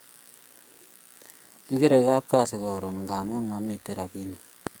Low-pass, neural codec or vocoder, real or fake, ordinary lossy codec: none; codec, 44.1 kHz, 2.6 kbps, SNAC; fake; none